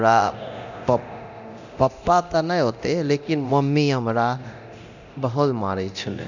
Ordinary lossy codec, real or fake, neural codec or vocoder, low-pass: none; fake; codec, 24 kHz, 0.9 kbps, DualCodec; 7.2 kHz